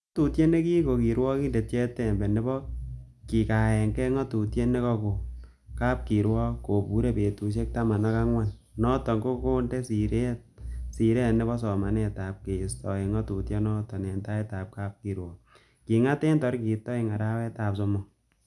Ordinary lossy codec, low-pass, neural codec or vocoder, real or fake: none; none; none; real